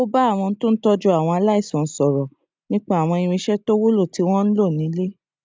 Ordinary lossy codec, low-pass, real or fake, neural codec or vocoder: none; none; real; none